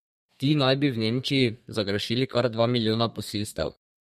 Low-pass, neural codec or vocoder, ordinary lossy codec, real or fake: 14.4 kHz; codec, 32 kHz, 1.9 kbps, SNAC; MP3, 64 kbps; fake